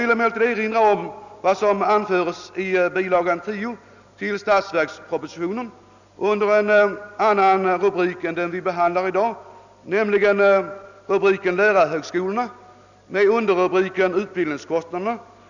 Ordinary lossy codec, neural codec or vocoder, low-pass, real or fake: none; none; 7.2 kHz; real